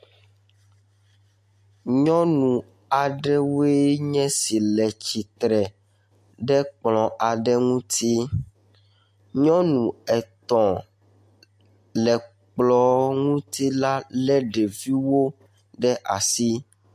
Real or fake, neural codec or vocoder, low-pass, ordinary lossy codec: real; none; 14.4 kHz; MP3, 64 kbps